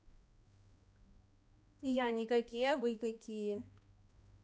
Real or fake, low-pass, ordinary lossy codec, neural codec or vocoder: fake; none; none; codec, 16 kHz, 1 kbps, X-Codec, HuBERT features, trained on balanced general audio